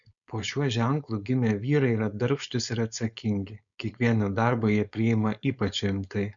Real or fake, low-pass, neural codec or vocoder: fake; 7.2 kHz; codec, 16 kHz, 4.8 kbps, FACodec